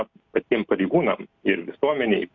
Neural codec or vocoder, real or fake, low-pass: none; real; 7.2 kHz